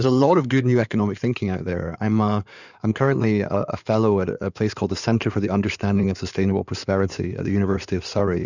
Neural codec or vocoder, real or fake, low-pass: codec, 16 kHz in and 24 kHz out, 2.2 kbps, FireRedTTS-2 codec; fake; 7.2 kHz